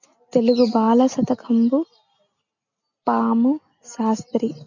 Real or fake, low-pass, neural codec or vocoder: real; 7.2 kHz; none